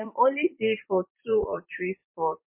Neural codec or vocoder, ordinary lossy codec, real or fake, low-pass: vocoder, 44.1 kHz, 128 mel bands every 256 samples, BigVGAN v2; MP3, 24 kbps; fake; 3.6 kHz